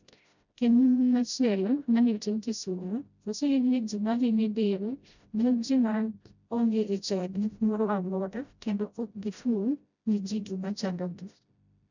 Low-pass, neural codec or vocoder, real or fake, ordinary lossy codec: 7.2 kHz; codec, 16 kHz, 0.5 kbps, FreqCodec, smaller model; fake; none